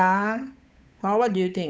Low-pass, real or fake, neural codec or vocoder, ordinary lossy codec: none; fake; codec, 16 kHz, 8 kbps, FunCodec, trained on Chinese and English, 25 frames a second; none